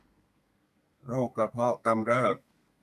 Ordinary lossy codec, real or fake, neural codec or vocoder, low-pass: none; fake; codec, 32 kHz, 1.9 kbps, SNAC; 14.4 kHz